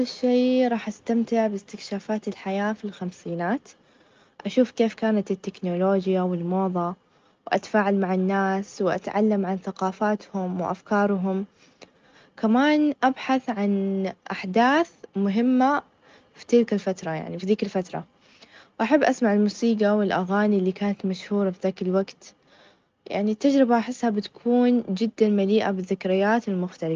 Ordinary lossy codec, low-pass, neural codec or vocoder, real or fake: Opus, 24 kbps; 7.2 kHz; none; real